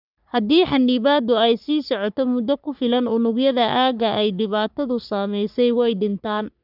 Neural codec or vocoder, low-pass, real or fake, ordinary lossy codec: codec, 44.1 kHz, 3.4 kbps, Pupu-Codec; 5.4 kHz; fake; none